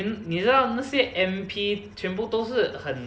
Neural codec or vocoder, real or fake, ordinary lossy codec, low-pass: none; real; none; none